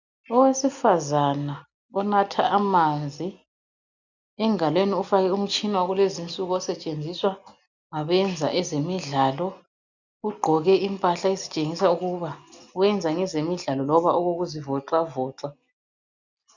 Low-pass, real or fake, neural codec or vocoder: 7.2 kHz; real; none